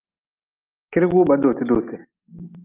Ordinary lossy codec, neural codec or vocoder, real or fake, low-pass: Opus, 24 kbps; none; real; 3.6 kHz